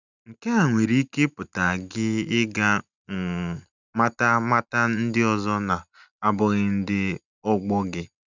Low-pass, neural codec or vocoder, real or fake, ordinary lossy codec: 7.2 kHz; none; real; none